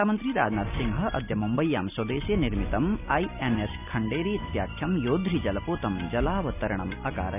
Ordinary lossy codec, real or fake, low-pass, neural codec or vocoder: none; real; 3.6 kHz; none